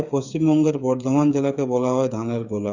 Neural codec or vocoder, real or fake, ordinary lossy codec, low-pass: codec, 16 kHz, 8 kbps, FreqCodec, smaller model; fake; none; 7.2 kHz